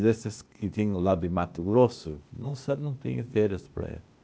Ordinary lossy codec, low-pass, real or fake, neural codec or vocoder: none; none; fake; codec, 16 kHz, 0.8 kbps, ZipCodec